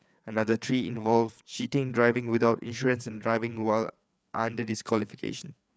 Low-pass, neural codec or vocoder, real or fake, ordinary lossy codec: none; codec, 16 kHz, 4 kbps, FreqCodec, larger model; fake; none